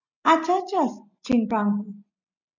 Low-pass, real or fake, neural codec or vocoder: 7.2 kHz; real; none